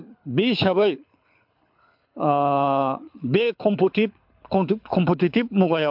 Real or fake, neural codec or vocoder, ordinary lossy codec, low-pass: fake; codec, 24 kHz, 6 kbps, HILCodec; MP3, 48 kbps; 5.4 kHz